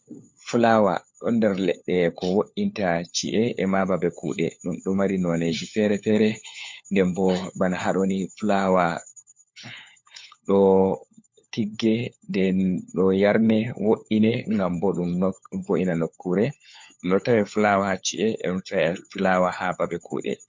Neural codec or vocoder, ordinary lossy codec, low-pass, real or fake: codec, 16 kHz, 4 kbps, FunCodec, trained on LibriTTS, 50 frames a second; MP3, 48 kbps; 7.2 kHz; fake